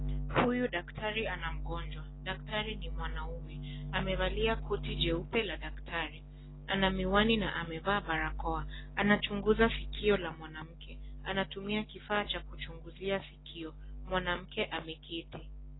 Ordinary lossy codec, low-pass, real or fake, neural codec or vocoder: AAC, 16 kbps; 7.2 kHz; real; none